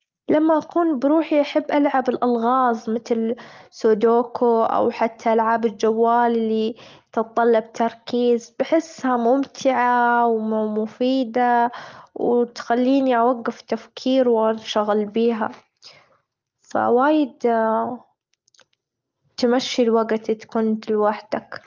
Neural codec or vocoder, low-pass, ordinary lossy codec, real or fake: none; 7.2 kHz; Opus, 32 kbps; real